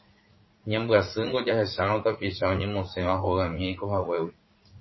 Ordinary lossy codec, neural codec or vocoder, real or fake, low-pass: MP3, 24 kbps; vocoder, 44.1 kHz, 80 mel bands, Vocos; fake; 7.2 kHz